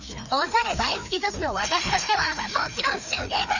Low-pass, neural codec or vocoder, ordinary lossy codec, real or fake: 7.2 kHz; codec, 16 kHz, 2 kbps, FreqCodec, larger model; none; fake